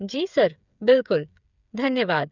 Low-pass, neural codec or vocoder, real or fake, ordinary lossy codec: 7.2 kHz; codec, 16 kHz, 8 kbps, FreqCodec, smaller model; fake; none